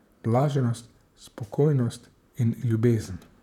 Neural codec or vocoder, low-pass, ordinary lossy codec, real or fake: vocoder, 44.1 kHz, 128 mel bands, Pupu-Vocoder; 19.8 kHz; none; fake